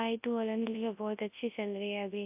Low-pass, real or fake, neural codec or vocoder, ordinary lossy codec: 3.6 kHz; fake; codec, 24 kHz, 0.9 kbps, WavTokenizer, large speech release; AAC, 32 kbps